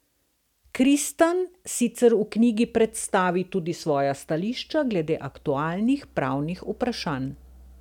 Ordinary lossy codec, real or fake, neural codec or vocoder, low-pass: none; real; none; 19.8 kHz